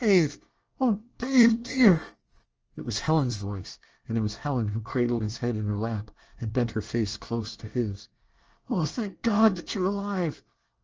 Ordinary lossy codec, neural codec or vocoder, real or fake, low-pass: Opus, 24 kbps; codec, 24 kHz, 1 kbps, SNAC; fake; 7.2 kHz